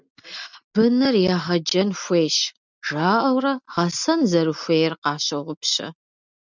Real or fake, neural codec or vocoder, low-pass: real; none; 7.2 kHz